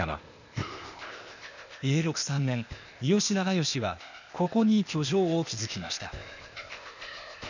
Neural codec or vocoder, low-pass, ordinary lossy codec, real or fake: codec, 16 kHz, 0.8 kbps, ZipCodec; 7.2 kHz; none; fake